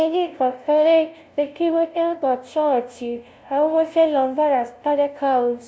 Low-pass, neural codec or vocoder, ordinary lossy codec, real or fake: none; codec, 16 kHz, 0.5 kbps, FunCodec, trained on LibriTTS, 25 frames a second; none; fake